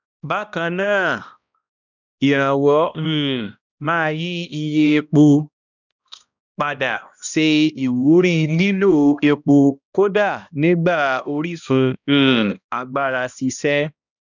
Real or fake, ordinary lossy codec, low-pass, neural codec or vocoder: fake; none; 7.2 kHz; codec, 16 kHz, 1 kbps, X-Codec, HuBERT features, trained on balanced general audio